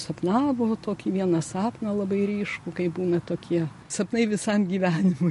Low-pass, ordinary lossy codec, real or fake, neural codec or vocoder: 14.4 kHz; MP3, 48 kbps; real; none